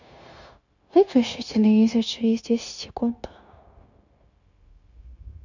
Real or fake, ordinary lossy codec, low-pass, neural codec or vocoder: fake; none; 7.2 kHz; codec, 16 kHz, 0.9 kbps, LongCat-Audio-Codec